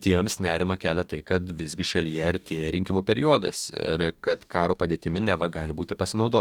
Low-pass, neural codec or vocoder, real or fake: 19.8 kHz; codec, 44.1 kHz, 2.6 kbps, DAC; fake